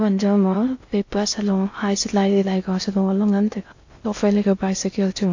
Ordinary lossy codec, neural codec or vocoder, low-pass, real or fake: AAC, 48 kbps; codec, 16 kHz in and 24 kHz out, 0.6 kbps, FocalCodec, streaming, 4096 codes; 7.2 kHz; fake